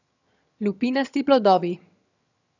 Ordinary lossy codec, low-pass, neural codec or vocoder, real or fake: none; 7.2 kHz; vocoder, 22.05 kHz, 80 mel bands, HiFi-GAN; fake